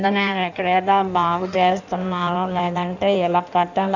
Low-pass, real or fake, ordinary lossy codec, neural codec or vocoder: 7.2 kHz; fake; none; codec, 16 kHz in and 24 kHz out, 1.1 kbps, FireRedTTS-2 codec